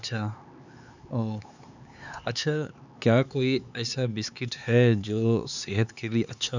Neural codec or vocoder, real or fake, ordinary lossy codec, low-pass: codec, 16 kHz, 4 kbps, X-Codec, HuBERT features, trained on LibriSpeech; fake; none; 7.2 kHz